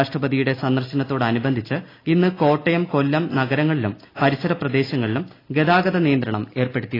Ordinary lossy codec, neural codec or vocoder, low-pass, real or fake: AAC, 24 kbps; none; 5.4 kHz; real